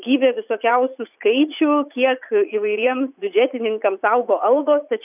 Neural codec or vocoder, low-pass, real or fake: vocoder, 44.1 kHz, 80 mel bands, Vocos; 3.6 kHz; fake